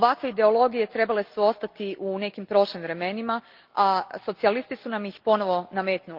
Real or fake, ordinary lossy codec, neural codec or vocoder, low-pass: real; Opus, 24 kbps; none; 5.4 kHz